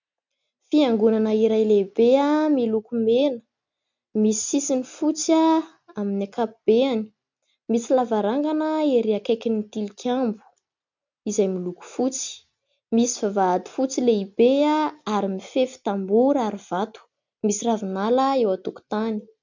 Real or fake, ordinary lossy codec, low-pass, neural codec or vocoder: real; MP3, 64 kbps; 7.2 kHz; none